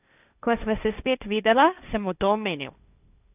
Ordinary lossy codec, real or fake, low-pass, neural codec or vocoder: none; fake; 3.6 kHz; codec, 16 kHz, 1.1 kbps, Voila-Tokenizer